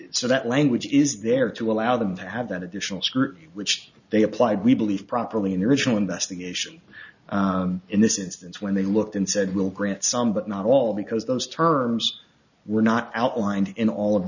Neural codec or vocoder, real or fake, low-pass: none; real; 7.2 kHz